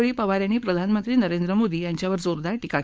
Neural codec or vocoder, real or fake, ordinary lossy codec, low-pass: codec, 16 kHz, 4.8 kbps, FACodec; fake; none; none